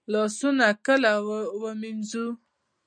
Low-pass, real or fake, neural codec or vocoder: 9.9 kHz; real; none